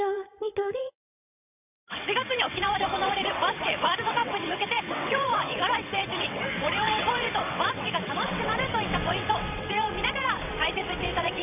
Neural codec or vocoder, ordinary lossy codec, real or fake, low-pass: vocoder, 22.05 kHz, 80 mel bands, WaveNeXt; none; fake; 3.6 kHz